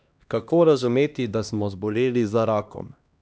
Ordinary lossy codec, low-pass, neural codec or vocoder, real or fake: none; none; codec, 16 kHz, 1 kbps, X-Codec, HuBERT features, trained on LibriSpeech; fake